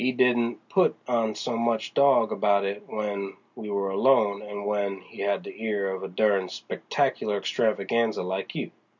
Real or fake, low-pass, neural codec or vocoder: real; 7.2 kHz; none